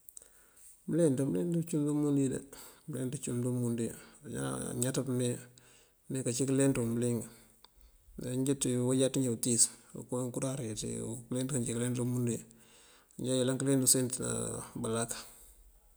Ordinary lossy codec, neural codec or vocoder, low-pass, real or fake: none; none; none; real